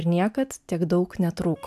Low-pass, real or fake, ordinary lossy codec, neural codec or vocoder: 14.4 kHz; real; AAC, 96 kbps; none